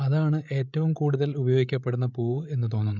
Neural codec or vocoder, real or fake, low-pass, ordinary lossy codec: codec, 16 kHz, 8 kbps, FreqCodec, larger model; fake; 7.2 kHz; none